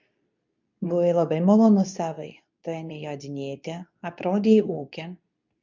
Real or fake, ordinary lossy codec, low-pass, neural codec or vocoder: fake; MP3, 64 kbps; 7.2 kHz; codec, 24 kHz, 0.9 kbps, WavTokenizer, medium speech release version 2